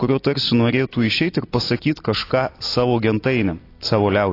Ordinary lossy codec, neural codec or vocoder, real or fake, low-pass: AAC, 32 kbps; none; real; 5.4 kHz